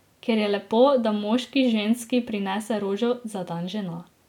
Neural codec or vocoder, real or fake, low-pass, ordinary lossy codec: none; real; 19.8 kHz; none